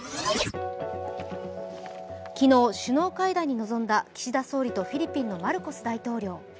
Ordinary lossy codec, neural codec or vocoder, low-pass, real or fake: none; none; none; real